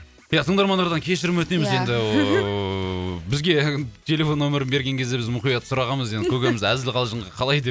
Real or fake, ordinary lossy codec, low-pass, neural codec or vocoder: real; none; none; none